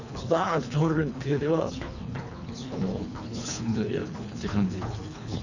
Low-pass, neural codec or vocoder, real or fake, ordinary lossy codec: 7.2 kHz; codec, 24 kHz, 3 kbps, HILCodec; fake; none